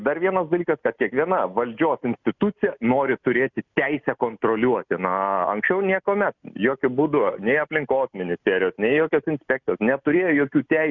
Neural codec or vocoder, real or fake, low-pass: none; real; 7.2 kHz